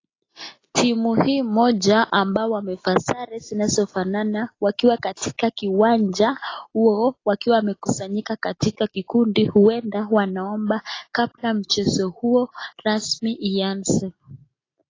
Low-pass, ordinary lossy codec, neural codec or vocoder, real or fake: 7.2 kHz; AAC, 32 kbps; none; real